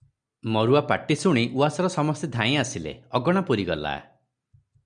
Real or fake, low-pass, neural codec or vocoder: real; 9.9 kHz; none